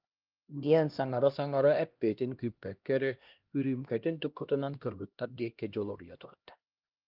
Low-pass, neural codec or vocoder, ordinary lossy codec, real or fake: 5.4 kHz; codec, 16 kHz, 1 kbps, X-Codec, HuBERT features, trained on LibriSpeech; Opus, 24 kbps; fake